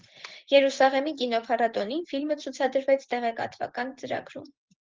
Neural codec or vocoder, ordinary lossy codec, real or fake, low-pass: vocoder, 24 kHz, 100 mel bands, Vocos; Opus, 16 kbps; fake; 7.2 kHz